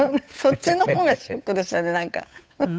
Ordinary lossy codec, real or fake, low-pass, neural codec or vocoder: none; fake; none; codec, 16 kHz, 8 kbps, FunCodec, trained on Chinese and English, 25 frames a second